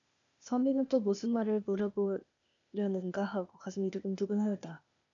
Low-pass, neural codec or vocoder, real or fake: 7.2 kHz; codec, 16 kHz, 0.8 kbps, ZipCodec; fake